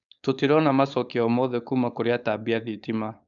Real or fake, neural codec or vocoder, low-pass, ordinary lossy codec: fake; codec, 16 kHz, 4.8 kbps, FACodec; 7.2 kHz; none